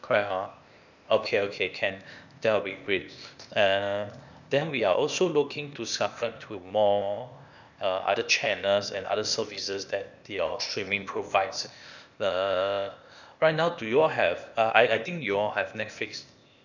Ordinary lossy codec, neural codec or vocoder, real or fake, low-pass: none; codec, 16 kHz, 0.8 kbps, ZipCodec; fake; 7.2 kHz